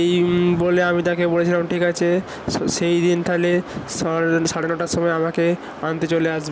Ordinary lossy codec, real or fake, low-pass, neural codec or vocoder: none; real; none; none